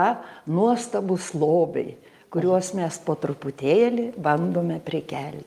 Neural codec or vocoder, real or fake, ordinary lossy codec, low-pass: none; real; Opus, 32 kbps; 14.4 kHz